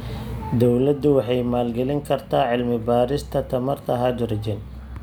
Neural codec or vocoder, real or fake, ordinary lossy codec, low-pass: none; real; none; none